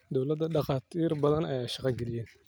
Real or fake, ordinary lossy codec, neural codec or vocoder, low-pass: real; none; none; none